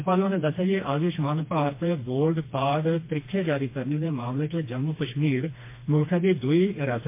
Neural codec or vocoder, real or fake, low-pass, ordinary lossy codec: codec, 16 kHz, 2 kbps, FreqCodec, smaller model; fake; 3.6 kHz; MP3, 24 kbps